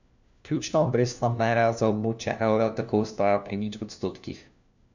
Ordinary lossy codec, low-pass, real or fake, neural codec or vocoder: none; 7.2 kHz; fake; codec, 16 kHz, 1 kbps, FunCodec, trained on LibriTTS, 50 frames a second